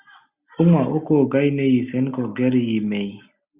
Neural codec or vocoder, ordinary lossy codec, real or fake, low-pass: none; Opus, 64 kbps; real; 3.6 kHz